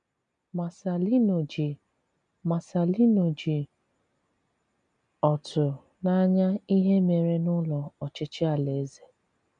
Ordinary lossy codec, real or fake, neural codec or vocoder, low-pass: MP3, 96 kbps; real; none; 9.9 kHz